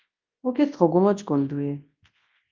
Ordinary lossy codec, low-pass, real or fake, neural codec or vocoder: Opus, 32 kbps; 7.2 kHz; fake; codec, 24 kHz, 0.9 kbps, WavTokenizer, large speech release